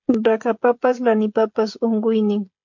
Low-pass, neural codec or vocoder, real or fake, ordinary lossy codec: 7.2 kHz; codec, 16 kHz, 16 kbps, FreqCodec, smaller model; fake; MP3, 48 kbps